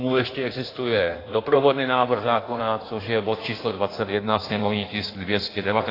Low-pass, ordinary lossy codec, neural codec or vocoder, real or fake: 5.4 kHz; AAC, 24 kbps; codec, 16 kHz in and 24 kHz out, 1.1 kbps, FireRedTTS-2 codec; fake